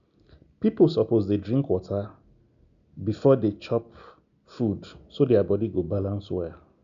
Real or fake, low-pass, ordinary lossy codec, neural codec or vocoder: real; 7.2 kHz; MP3, 96 kbps; none